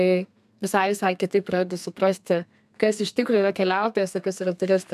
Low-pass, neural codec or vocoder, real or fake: 14.4 kHz; codec, 32 kHz, 1.9 kbps, SNAC; fake